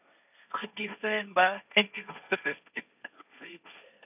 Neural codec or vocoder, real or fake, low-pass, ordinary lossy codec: codec, 16 kHz, 1.1 kbps, Voila-Tokenizer; fake; 3.6 kHz; none